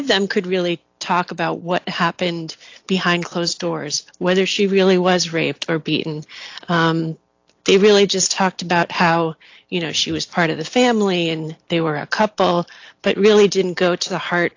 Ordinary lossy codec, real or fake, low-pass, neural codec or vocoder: AAC, 48 kbps; real; 7.2 kHz; none